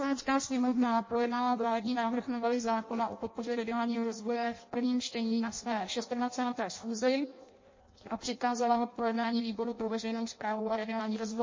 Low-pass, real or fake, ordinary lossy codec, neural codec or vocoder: 7.2 kHz; fake; MP3, 32 kbps; codec, 16 kHz in and 24 kHz out, 0.6 kbps, FireRedTTS-2 codec